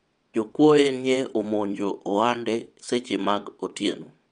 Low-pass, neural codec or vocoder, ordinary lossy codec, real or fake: 9.9 kHz; vocoder, 22.05 kHz, 80 mel bands, WaveNeXt; none; fake